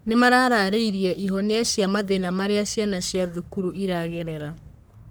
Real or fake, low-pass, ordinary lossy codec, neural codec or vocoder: fake; none; none; codec, 44.1 kHz, 3.4 kbps, Pupu-Codec